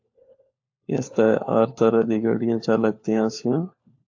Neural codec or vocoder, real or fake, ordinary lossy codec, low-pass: codec, 16 kHz, 4 kbps, FunCodec, trained on LibriTTS, 50 frames a second; fake; AAC, 48 kbps; 7.2 kHz